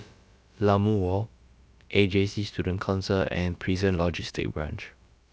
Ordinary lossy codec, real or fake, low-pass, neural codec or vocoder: none; fake; none; codec, 16 kHz, about 1 kbps, DyCAST, with the encoder's durations